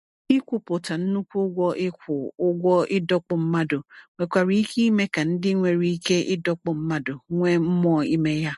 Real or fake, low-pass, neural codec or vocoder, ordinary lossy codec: real; 14.4 kHz; none; MP3, 48 kbps